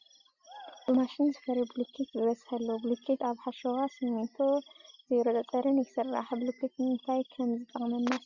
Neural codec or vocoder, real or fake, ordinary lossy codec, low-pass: none; real; Opus, 64 kbps; 7.2 kHz